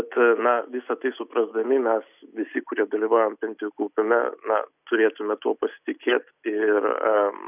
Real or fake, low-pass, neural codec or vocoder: real; 3.6 kHz; none